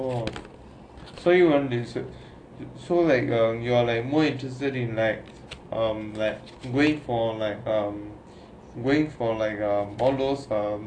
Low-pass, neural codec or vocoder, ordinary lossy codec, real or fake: 9.9 kHz; none; AAC, 48 kbps; real